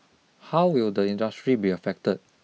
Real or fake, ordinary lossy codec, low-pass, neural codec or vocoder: real; none; none; none